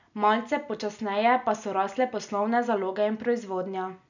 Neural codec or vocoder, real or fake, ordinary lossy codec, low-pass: none; real; none; 7.2 kHz